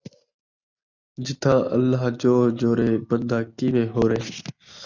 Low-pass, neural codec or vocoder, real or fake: 7.2 kHz; none; real